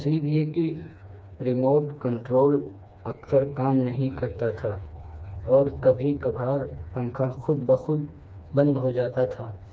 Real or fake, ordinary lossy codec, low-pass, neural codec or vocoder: fake; none; none; codec, 16 kHz, 2 kbps, FreqCodec, smaller model